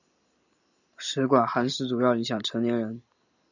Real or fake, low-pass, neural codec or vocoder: real; 7.2 kHz; none